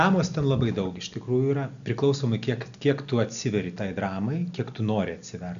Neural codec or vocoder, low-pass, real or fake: none; 7.2 kHz; real